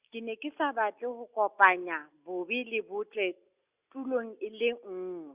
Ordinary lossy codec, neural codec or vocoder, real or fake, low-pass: Opus, 64 kbps; none; real; 3.6 kHz